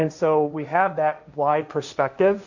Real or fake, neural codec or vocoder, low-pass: fake; codec, 16 kHz, 1.1 kbps, Voila-Tokenizer; 7.2 kHz